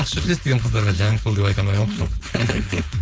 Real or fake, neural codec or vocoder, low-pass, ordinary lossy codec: fake; codec, 16 kHz, 4.8 kbps, FACodec; none; none